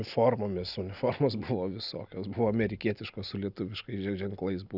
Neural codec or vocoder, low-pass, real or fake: none; 5.4 kHz; real